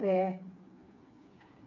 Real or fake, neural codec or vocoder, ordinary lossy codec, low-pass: fake; codec, 16 kHz, 4 kbps, FreqCodec, smaller model; AAC, 48 kbps; 7.2 kHz